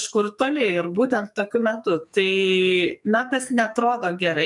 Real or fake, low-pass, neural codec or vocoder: fake; 10.8 kHz; codec, 44.1 kHz, 2.6 kbps, SNAC